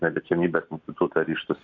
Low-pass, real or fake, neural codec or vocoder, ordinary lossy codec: 7.2 kHz; real; none; AAC, 48 kbps